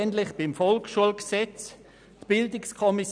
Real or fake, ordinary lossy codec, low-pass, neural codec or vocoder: real; none; 9.9 kHz; none